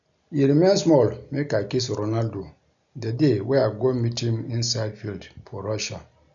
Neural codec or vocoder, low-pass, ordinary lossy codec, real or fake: none; 7.2 kHz; none; real